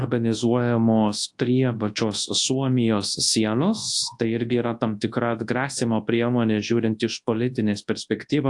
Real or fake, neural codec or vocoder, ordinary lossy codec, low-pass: fake; codec, 24 kHz, 0.9 kbps, WavTokenizer, large speech release; AAC, 64 kbps; 10.8 kHz